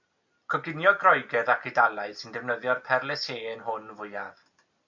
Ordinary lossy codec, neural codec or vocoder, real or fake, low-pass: MP3, 48 kbps; none; real; 7.2 kHz